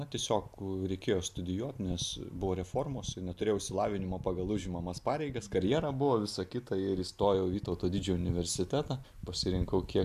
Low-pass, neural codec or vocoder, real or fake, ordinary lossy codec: 14.4 kHz; none; real; AAC, 96 kbps